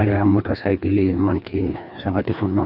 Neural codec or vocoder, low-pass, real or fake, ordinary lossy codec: codec, 16 kHz, 2 kbps, FreqCodec, larger model; 5.4 kHz; fake; none